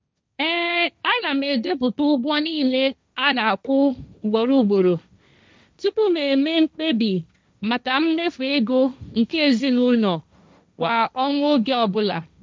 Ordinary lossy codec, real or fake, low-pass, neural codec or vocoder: none; fake; none; codec, 16 kHz, 1.1 kbps, Voila-Tokenizer